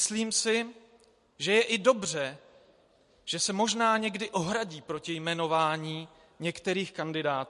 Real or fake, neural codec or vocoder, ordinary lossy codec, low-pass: real; none; MP3, 48 kbps; 14.4 kHz